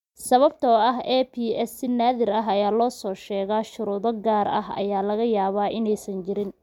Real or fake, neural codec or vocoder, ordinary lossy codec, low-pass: real; none; MP3, 96 kbps; 19.8 kHz